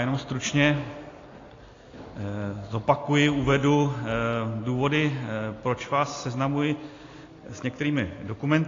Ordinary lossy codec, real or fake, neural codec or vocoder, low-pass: AAC, 32 kbps; real; none; 7.2 kHz